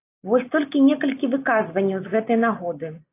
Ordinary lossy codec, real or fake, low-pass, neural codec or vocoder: AAC, 24 kbps; real; 3.6 kHz; none